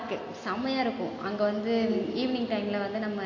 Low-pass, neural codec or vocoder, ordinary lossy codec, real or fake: 7.2 kHz; none; AAC, 32 kbps; real